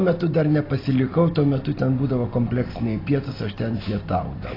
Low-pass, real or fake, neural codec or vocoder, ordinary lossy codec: 5.4 kHz; real; none; AAC, 24 kbps